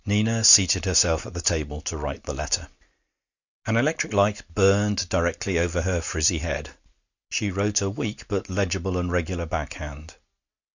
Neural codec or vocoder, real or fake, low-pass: none; real; 7.2 kHz